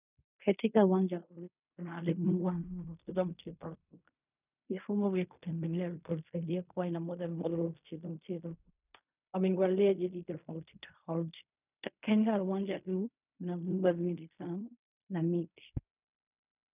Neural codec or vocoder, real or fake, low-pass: codec, 16 kHz in and 24 kHz out, 0.4 kbps, LongCat-Audio-Codec, fine tuned four codebook decoder; fake; 3.6 kHz